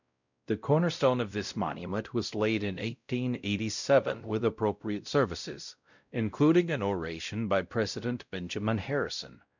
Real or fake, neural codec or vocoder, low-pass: fake; codec, 16 kHz, 0.5 kbps, X-Codec, WavLM features, trained on Multilingual LibriSpeech; 7.2 kHz